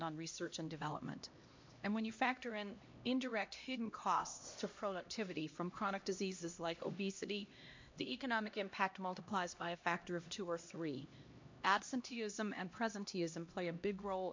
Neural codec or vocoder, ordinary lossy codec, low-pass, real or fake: codec, 16 kHz, 1 kbps, X-Codec, HuBERT features, trained on LibriSpeech; MP3, 48 kbps; 7.2 kHz; fake